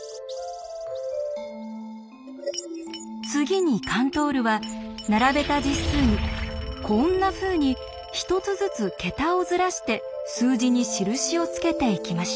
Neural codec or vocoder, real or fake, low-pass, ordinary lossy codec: none; real; none; none